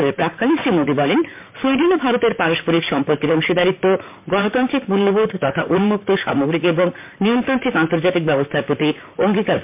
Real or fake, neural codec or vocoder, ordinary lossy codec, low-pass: fake; vocoder, 44.1 kHz, 128 mel bands, Pupu-Vocoder; MP3, 32 kbps; 3.6 kHz